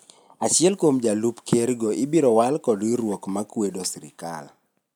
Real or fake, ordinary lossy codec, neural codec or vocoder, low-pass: real; none; none; none